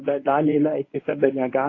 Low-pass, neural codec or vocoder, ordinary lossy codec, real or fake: 7.2 kHz; codec, 24 kHz, 0.9 kbps, WavTokenizer, medium speech release version 1; AAC, 32 kbps; fake